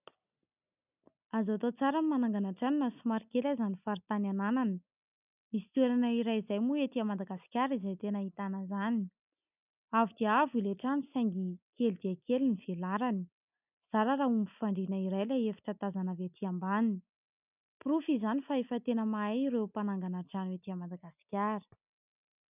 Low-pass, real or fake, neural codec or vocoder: 3.6 kHz; real; none